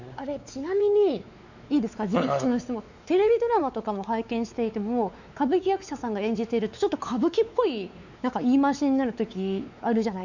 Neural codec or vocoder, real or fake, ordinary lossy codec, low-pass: codec, 16 kHz, 4 kbps, X-Codec, WavLM features, trained on Multilingual LibriSpeech; fake; none; 7.2 kHz